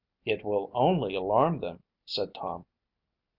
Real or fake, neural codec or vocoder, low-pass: real; none; 5.4 kHz